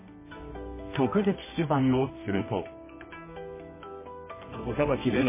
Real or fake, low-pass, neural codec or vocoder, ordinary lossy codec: fake; 3.6 kHz; codec, 24 kHz, 0.9 kbps, WavTokenizer, medium music audio release; MP3, 16 kbps